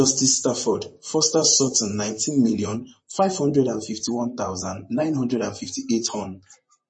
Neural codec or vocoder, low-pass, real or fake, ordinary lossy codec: vocoder, 44.1 kHz, 128 mel bands, Pupu-Vocoder; 9.9 kHz; fake; MP3, 32 kbps